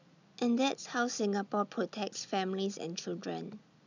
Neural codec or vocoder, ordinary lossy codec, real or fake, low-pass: none; none; real; 7.2 kHz